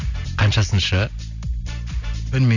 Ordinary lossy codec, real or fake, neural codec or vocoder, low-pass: none; real; none; 7.2 kHz